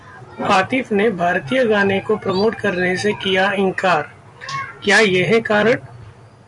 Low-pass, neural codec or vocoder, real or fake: 10.8 kHz; none; real